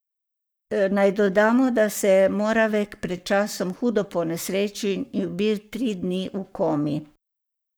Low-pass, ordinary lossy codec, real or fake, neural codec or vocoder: none; none; fake; codec, 44.1 kHz, 7.8 kbps, Pupu-Codec